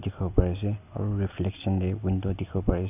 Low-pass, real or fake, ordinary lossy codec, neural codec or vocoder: 3.6 kHz; real; none; none